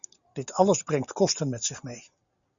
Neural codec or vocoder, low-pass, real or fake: none; 7.2 kHz; real